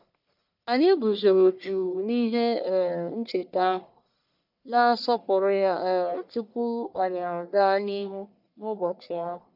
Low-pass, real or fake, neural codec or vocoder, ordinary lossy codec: 5.4 kHz; fake; codec, 44.1 kHz, 1.7 kbps, Pupu-Codec; none